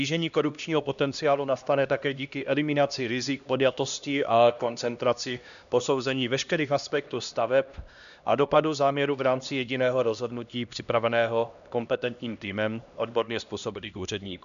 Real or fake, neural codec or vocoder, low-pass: fake; codec, 16 kHz, 1 kbps, X-Codec, HuBERT features, trained on LibriSpeech; 7.2 kHz